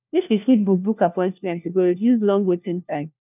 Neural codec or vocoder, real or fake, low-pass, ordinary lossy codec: codec, 16 kHz, 1 kbps, FunCodec, trained on LibriTTS, 50 frames a second; fake; 3.6 kHz; none